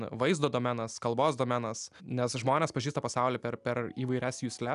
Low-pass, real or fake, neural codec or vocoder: 10.8 kHz; real; none